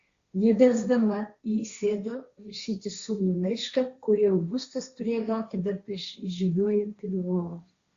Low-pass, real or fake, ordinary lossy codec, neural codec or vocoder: 7.2 kHz; fake; Opus, 64 kbps; codec, 16 kHz, 1.1 kbps, Voila-Tokenizer